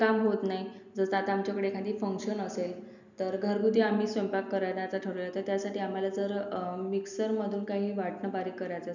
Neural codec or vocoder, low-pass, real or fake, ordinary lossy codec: none; 7.2 kHz; real; none